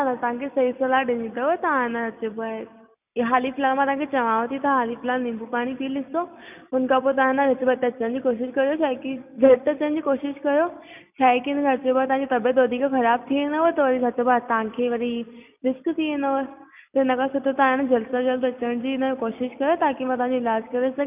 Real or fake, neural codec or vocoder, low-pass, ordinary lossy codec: real; none; 3.6 kHz; none